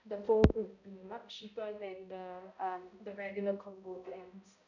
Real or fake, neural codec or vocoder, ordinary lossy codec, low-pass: fake; codec, 16 kHz, 0.5 kbps, X-Codec, HuBERT features, trained on balanced general audio; none; 7.2 kHz